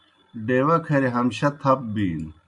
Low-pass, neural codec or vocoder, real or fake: 10.8 kHz; none; real